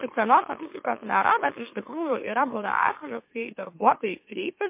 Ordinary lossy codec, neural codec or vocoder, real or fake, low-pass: MP3, 24 kbps; autoencoder, 44.1 kHz, a latent of 192 numbers a frame, MeloTTS; fake; 3.6 kHz